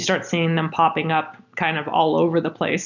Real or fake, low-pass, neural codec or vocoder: real; 7.2 kHz; none